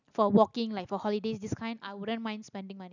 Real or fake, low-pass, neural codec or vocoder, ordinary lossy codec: real; 7.2 kHz; none; none